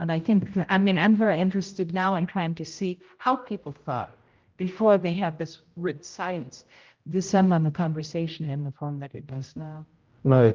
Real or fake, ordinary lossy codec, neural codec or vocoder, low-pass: fake; Opus, 32 kbps; codec, 16 kHz, 0.5 kbps, X-Codec, HuBERT features, trained on general audio; 7.2 kHz